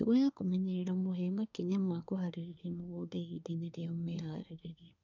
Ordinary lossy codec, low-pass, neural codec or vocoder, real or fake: none; 7.2 kHz; codec, 24 kHz, 1 kbps, SNAC; fake